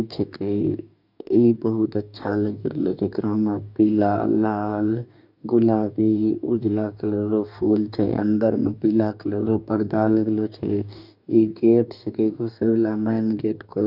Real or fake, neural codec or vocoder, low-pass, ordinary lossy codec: fake; codec, 44.1 kHz, 2.6 kbps, DAC; 5.4 kHz; none